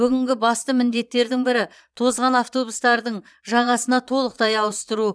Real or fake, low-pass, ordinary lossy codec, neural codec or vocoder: fake; none; none; vocoder, 22.05 kHz, 80 mel bands, Vocos